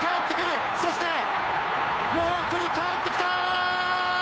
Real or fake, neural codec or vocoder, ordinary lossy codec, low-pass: fake; codec, 16 kHz, 2 kbps, FunCodec, trained on Chinese and English, 25 frames a second; none; none